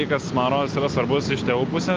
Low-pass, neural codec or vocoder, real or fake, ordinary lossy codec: 7.2 kHz; none; real; Opus, 32 kbps